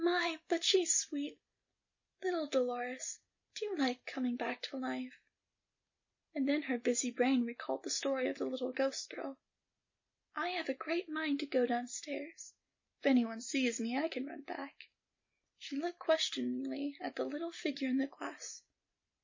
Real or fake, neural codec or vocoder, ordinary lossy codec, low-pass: real; none; MP3, 32 kbps; 7.2 kHz